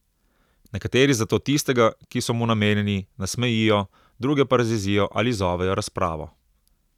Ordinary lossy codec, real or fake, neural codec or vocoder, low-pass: none; real; none; 19.8 kHz